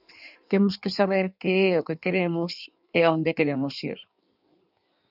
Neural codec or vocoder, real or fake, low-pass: codec, 16 kHz in and 24 kHz out, 1.1 kbps, FireRedTTS-2 codec; fake; 5.4 kHz